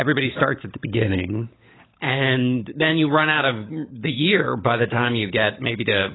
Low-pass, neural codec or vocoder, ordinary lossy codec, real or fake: 7.2 kHz; codec, 16 kHz, 16 kbps, FunCodec, trained on LibriTTS, 50 frames a second; AAC, 16 kbps; fake